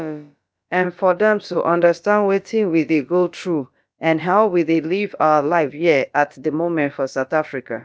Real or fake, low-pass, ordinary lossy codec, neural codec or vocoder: fake; none; none; codec, 16 kHz, about 1 kbps, DyCAST, with the encoder's durations